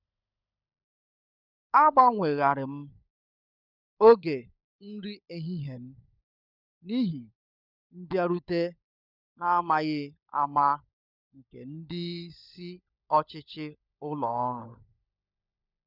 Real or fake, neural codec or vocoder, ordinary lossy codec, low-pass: fake; codec, 16 kHz, 16 kbps, FunCodec, trained on LibriTTS, 50 frames a second; none; 5.4 kHz